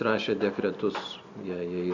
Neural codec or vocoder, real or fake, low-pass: none; real; 7.2 kHz